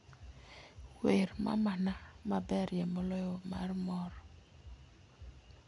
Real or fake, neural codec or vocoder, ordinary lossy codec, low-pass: real; none; none; 10.8 kHz